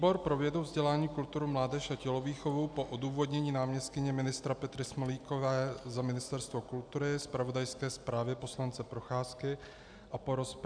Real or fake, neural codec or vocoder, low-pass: real; none; 9.9 kHz